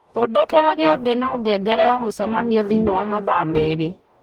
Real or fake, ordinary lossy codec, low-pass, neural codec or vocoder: fake; Opus, 24 kbps; 19.8 kHz; codec, 44.1 kHz, 0.9 kbps, DAC